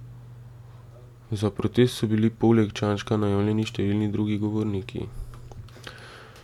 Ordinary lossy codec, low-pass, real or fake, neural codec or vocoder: MP3, 96 kbps; 19.8 kHz; real; none